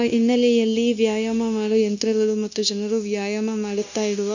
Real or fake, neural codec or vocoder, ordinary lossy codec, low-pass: fake; codec, 16 kHz, 0.9 kbps, LongCat-Audio-Codec; none; 7.2 kHz